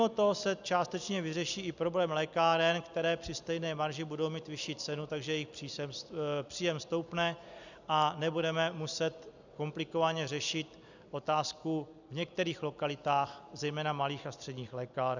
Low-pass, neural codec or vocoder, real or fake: 7.2 kHz; none; real